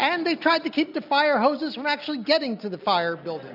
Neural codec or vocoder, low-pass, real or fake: none; 5.4 kHz; real